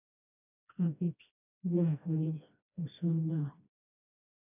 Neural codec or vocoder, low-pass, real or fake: codec, 16 kHz, 1 kbps, FreqCodec, smaller model; 3.6 kHz; fake